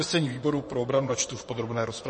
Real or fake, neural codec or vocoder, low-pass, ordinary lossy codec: fake; vocoder, 24 kHz, 100 mel bands, Vocos; 10.8 kHz; MP3, 32 kbps